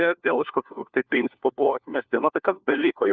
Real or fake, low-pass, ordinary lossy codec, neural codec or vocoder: fake; 7.2 kHz; Opus, 32 kbps; codec, 16 kHz, 1 kbps, FunCodec, trained on LibriTTS, 50 frames a second